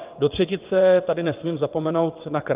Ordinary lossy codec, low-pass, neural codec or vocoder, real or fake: Opus, 32 kbps; 3.6 kHz; vocoder, 22.05 kHz, 80 mel bands, WaveNeXt; fake